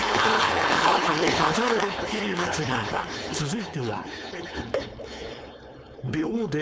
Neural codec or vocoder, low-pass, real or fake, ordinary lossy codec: codec, 16 kHz, 4.8 kbps, FACodec; none; fake; none